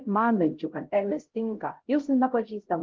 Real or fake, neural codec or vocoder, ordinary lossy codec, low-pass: fake; codec, 16 kHz, 0.5 kbps, X-Codec, HuBERT features, trained on LibriSpeech; Opus, 16 kbps; 7.2 kHz